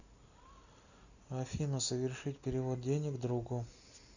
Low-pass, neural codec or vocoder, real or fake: 7.2 kHz; none; real